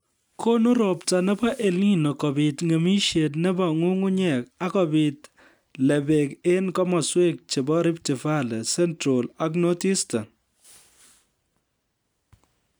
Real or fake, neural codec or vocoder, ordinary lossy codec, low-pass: real; none; none; none